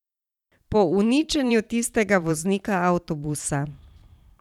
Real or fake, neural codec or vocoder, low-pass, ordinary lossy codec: fake; vocoder, 44.1 kHz, 128 mel bands every 512 samples, BigVGAN v2; 19.8 kHz; none